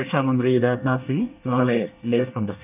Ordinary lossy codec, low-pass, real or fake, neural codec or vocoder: none; 3.6 kHz; fake; codec, 24 kHz, 1 kbps, SNAC